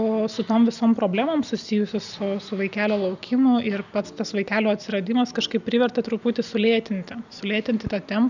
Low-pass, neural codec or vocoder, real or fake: 7.2 kHz; none; real